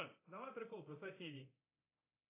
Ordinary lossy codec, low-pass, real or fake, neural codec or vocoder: AAC, 24 kbps; 3.6 kHz; fake; codec, 24 kHz, 0.9 kbps, DualCodec